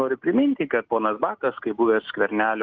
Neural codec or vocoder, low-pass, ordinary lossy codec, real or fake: none; 7.2 kHz; Opus, 32 kbps; real